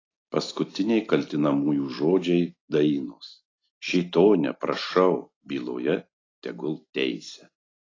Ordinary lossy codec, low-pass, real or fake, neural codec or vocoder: AAC, 32 kbps; 7.2 kHz; real; none